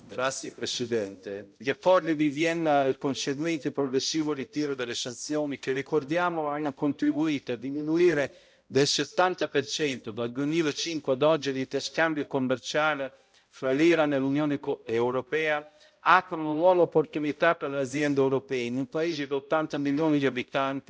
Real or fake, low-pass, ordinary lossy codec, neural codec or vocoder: fake; none; none; codec, 16 kHz, 0.5 kbps, X-Codec, HuBERT features, trained on balanced general audio